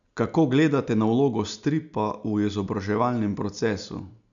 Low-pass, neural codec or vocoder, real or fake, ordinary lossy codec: 7.2 kHz; none; real; none